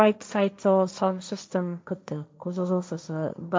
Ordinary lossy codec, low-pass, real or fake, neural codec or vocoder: none; none; fake; codec, 16 kHz, 1.1 kbps, Voila-Tokenizer